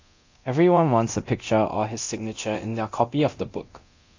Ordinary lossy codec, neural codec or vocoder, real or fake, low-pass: AAC, 48 kbps; codec, 24 kHz, 0.9 kbps, DualCodec; fake; 7.2 kHz